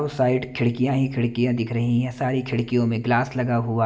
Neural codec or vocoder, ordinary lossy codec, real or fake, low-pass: none; none; real; none